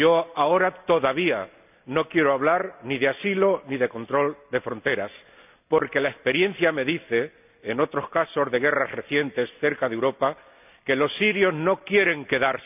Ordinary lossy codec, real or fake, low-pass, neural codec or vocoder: none; real; 3.6 kHz; none